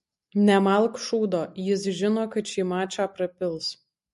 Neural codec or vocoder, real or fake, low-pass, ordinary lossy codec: none; real; 14.4 kHz; MP3, 48 kbps